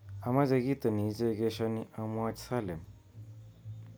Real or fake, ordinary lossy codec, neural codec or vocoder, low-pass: real; none; none; none